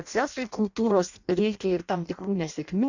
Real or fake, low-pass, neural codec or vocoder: fake; 7.2 kHz; codec, 16 kHz in and 24 kHz out, 0.6 kbps, FireRedTTS-2 codec